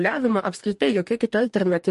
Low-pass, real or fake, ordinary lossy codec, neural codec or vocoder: 14.4 kHz; fake; MP3, 48 kbps; codec, 44.1 kHz, 2.6 kbps, DAC